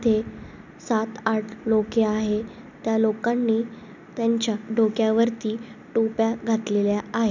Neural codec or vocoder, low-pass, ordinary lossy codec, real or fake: none; 7.2 kHz; none; real